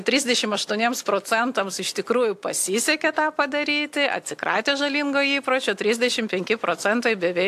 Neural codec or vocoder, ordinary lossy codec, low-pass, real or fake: none; AAC, 64 kbps; 14.4 kHz; real